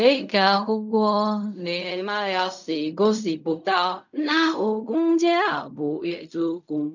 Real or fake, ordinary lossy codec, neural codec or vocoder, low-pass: fake; none; codec, 16 kHz in and 24 kHz out, 0.4 kbps, LongCat-Audio-Codec, fine tuned four codebook decoder; 7.2 kHz